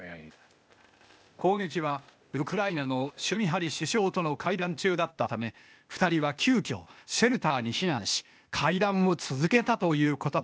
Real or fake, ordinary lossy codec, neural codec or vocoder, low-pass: fake; none; codec, 16 kHz, 0.8 kbps, ZipCodec; none